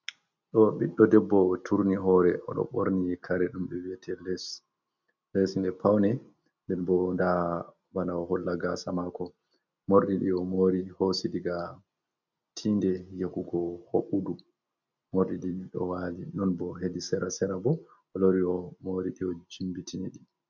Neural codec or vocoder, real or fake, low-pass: none; real; 7.2 kHz